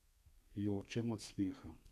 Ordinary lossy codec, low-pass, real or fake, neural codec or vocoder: none; 14.4 kHz; fake; codec, 32 kHz, 1.9 kbps, SNAC